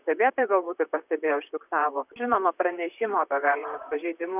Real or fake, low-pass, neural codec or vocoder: fake; 3.6 kHz; vocoder, 44.1 kHz, 128 mel bands, Pupu-Vocoder